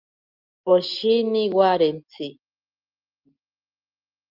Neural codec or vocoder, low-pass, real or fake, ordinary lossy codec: none; 5.4 kHz; real; Opus, 24 kbps